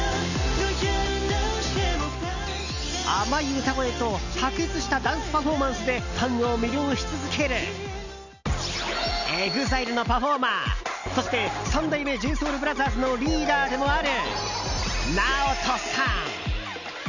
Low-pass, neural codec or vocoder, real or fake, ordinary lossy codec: 7.2 kHz; none; real; none